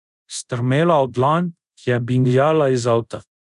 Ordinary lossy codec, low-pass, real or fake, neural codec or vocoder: none; 10.8 kHz; fake; codec, 24 kHz, 0.5 kbps, DualCodec